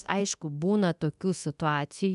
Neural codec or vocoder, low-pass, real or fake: codec, 24 kHz, 0.9 kbps, DualCodec; 10.8 kHz; fake